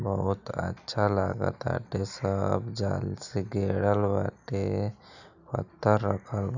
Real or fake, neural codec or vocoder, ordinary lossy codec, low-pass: real; none; none; 7.2 kHz